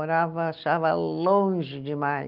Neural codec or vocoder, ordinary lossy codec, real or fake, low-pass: none; Opus, 24 kbps; real; 5.4 kHz